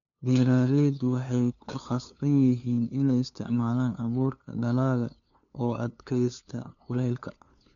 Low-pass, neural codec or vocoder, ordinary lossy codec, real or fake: 7.2 kHz; codec, 16 kHz, 2 kbps, FunCodec, trained on LibriTTS, 25 frames a second; none; fake